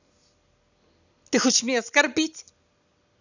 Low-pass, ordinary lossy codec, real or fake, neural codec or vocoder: 7.2 kHz; none; real; none